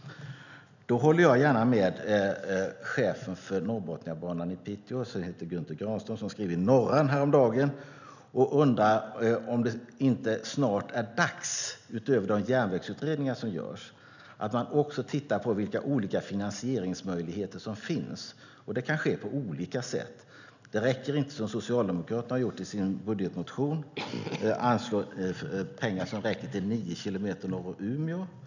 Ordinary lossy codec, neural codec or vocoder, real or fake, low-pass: none; none; real; 7.2 kHz